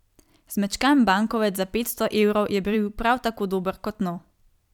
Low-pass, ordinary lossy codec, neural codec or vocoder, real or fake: 19.8 kHz; none; vocoder, 44.1 kHz, 128 mel bands every 256 samples, BigVGAN v2; fake